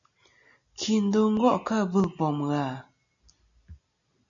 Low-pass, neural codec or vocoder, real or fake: 7.2 kHz; none; real